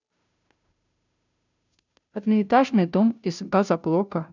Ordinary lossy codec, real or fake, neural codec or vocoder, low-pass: none; fake; codec, 16 kHz, 0.5 kbps, FunCodec, trained on Chinese and English, 25 frames a second; 7.2 kHz